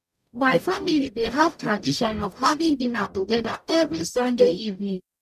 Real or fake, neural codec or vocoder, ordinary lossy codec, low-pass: fake; codec, 44.1 kHz, 0.9 kbps, DAC; none; 14.4 kHz